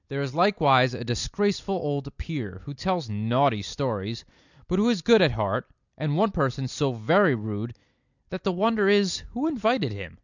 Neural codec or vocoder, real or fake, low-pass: none; real; 7.2 kHz